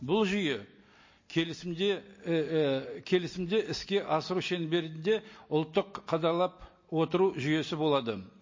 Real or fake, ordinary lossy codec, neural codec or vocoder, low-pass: real; MP3, 32 kbps; none; 7.2 kHz